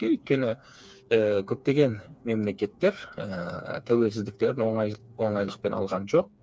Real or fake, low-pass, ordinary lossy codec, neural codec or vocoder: fake; none; none; codec, 16 kHz, 4 kbps, FreqCodec, smaller model